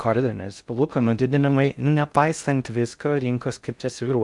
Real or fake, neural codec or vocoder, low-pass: fake; codec, 16 kHz in and 24 kHz out, 0.6 kbps, FocalCodec, streaming, 4096 codes; 10.8 kHz